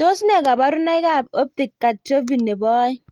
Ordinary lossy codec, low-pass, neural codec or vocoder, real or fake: Opus, 24 kbps; 19.8 kHz; none; real